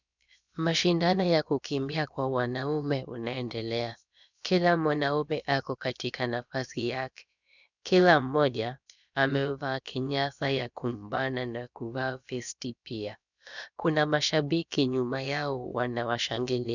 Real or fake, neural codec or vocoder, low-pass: fake; codec, 16 kHz, about 1 kbps, DyCAST, with the encoder's durations; 7.2 kHz